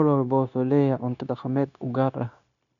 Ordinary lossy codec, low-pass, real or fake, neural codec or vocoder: none; 7.2 kHz; fake; codec, 16 kHz, 0.9 kbps, LongCat-Audio-Codec